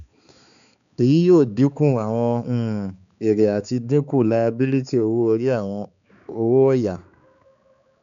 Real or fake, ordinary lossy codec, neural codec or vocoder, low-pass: fake; none; codec, 16 kHz, 4 kbps, X-Codec, HuBERT features, trained on balanced general audio; 7.2 kHz